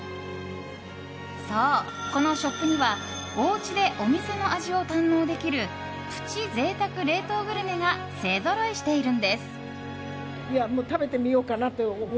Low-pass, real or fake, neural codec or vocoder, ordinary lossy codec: none; real; none; none